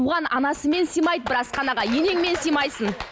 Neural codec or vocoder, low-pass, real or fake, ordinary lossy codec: none; none; real; none